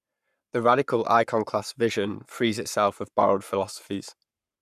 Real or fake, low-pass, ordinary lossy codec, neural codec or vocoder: fake; 14.4 kHz; none; codec, 44.1 kHz, 7.8 kbps, Pupu-Codec